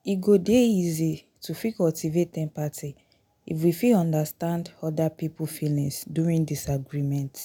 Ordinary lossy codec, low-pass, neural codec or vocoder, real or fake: none; none; none; real